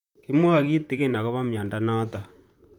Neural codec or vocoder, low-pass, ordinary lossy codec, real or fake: vocoder, 44.1 kHz, 128 mel bands, Pupu-Vocoder; 19.8 kHz; none; fake